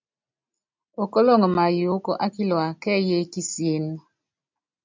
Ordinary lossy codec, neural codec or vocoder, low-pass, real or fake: MP3, 64 kbps; none; 7.2 kHz; real